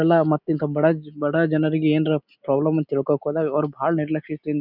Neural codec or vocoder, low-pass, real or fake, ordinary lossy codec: none; 5.4 kHz; real; none